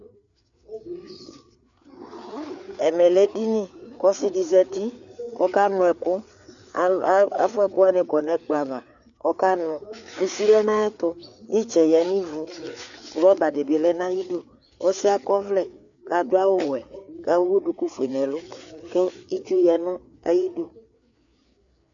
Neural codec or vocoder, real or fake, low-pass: codec, 16 kHz, 4 kbps, FreqCodec, larger model; fake; 7.2 kHz